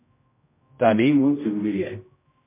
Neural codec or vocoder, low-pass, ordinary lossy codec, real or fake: codec, 16 kHz, 0.5 kbps, X-Codec, HuBERT features, trained on general audio; 3.6 kHz; MP3, 24 kbps; fake